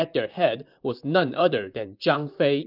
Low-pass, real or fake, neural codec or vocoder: 5.4 kHz; fake; vocoder, 44.1 kHz, 128 mel bands, Pupu-Vocoder